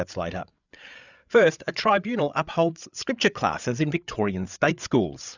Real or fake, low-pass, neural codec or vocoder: fake; 7.2 kHz; codec, 16 kHz, 8 kbps, FreqCodec, larger model